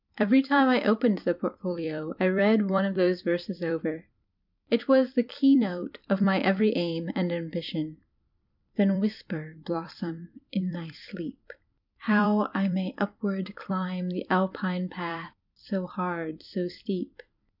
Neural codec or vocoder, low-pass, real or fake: vocoder, 44.1 kHz, 128 mel bands every 256 samples, BigVGAN v2; 5.4 kHz; fake